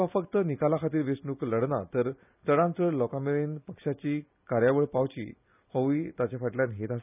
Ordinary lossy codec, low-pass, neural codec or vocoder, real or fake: none; 3.6 kHz; none; real